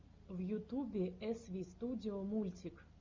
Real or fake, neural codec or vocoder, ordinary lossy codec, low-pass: real; none; MP3, 64 kbps; 7.2 kHz